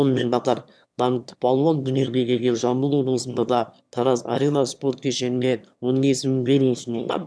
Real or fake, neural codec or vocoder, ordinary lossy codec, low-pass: fake; autoencoder, 22.05 kHz, a latent of 192 numbers a frame, VITS, trained on one speaker; none; none